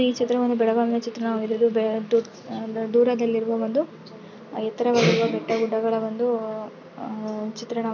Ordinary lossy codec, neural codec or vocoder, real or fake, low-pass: none; none; real; 7.2 kHz